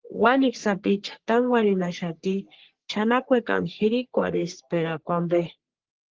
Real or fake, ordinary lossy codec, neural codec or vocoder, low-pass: fake; Opus, 16 kbps; codec, 44.1 kHz, 3.4 kbps, Pupu-Codec; 7.2 kHz